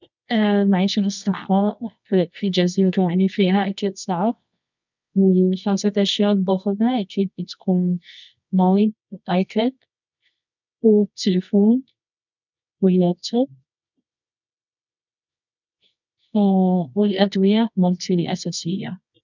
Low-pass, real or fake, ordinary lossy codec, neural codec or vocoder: 7.2 kHz; fake; none; codec, 24 kHz, 0.9 kbps, WavTokenizer, medium music audio release